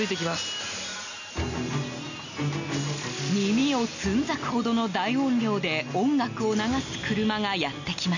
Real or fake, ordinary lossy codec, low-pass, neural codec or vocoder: real; none; 7.2 kHz; none